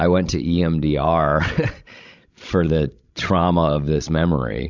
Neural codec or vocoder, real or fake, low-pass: codec, 16 kHz, 16 kbps, FreqCodec, larger model; fake; 7.2 kHz